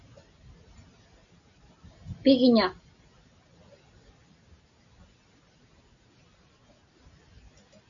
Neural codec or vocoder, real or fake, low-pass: none; real; 7.2 kHz